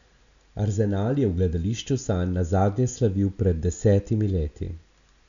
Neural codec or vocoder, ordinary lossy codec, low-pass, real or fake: none; none; 7.2 kHz; real